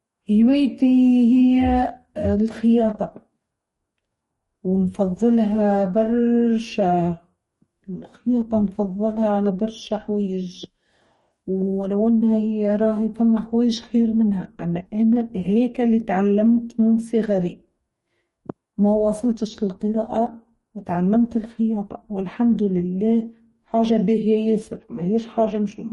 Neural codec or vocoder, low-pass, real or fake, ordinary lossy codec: codec, 44.1 kHz, 2.6 kbps, DAC; 19.8 kHz; fake; MP3, 48 kbps